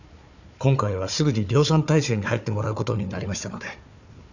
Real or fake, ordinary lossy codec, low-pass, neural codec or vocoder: fake; none; 7.2 kHz; codec, 16 kHz, 4 kbps, FunCodec, trained on Chinese and English, 50 frames a second